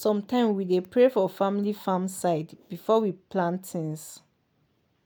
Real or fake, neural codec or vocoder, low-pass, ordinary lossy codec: real; none; none; none